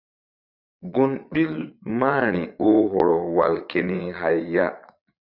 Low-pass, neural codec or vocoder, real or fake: 5.4 kHz; vocoder, 22.05 kHz, 80 mel bands, Vocos; fake